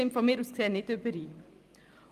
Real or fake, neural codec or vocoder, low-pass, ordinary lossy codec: fake; vocoder, 44.1 kHz, 128 mel bands every 256 samples, BigVGAN v2; 14.4 kHz; Opus, 32 kbps